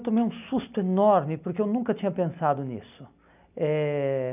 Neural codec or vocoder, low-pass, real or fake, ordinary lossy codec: none; 3.6 kHz; real; none